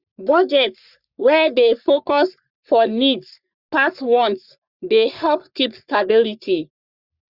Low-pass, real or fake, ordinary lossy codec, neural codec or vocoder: 5.4 kHz; fake; Opus, 64 kbps; codec, 44.1 kHz, 3.4 kbps, Pupu-Codec